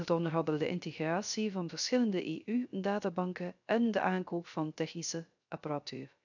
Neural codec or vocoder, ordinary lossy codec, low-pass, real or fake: codec, 16 kHz, 0.3 kbps, FocalCodec; none; 7.2 kHz; fake